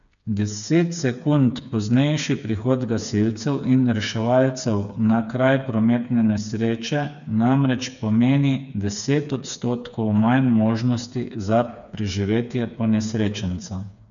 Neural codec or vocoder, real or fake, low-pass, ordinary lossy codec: codec, 16 kHz, 4 kbps, FreqCodec, smaller model; fake; 7.2 kHz; none